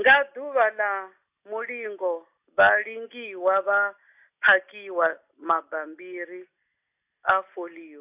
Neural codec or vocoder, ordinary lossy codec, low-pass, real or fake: none; AAC, 32 kbps; 3.6 kHz; real